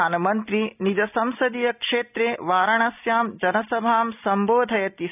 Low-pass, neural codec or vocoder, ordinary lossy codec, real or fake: 3.6 kHz; none; none; real